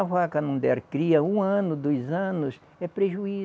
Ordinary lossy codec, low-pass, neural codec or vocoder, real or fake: none; none; none; real